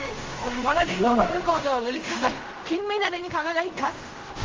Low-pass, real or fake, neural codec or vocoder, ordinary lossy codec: 7.2 kHz; fake; codec, 16 kHz in and 24 kHz out, 0.4 kbps, LongCat-Audio-Codec, fine tuned four codebook decoder; Opus, 32 kbps